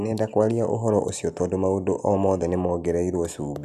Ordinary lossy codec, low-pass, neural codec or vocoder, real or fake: none; 14.4 kHz; none; real